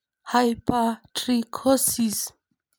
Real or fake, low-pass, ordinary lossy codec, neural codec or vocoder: fake; none; none; vocoder, 44.1 kHz, 128 mel bands every 256 samples, BigVGAN v2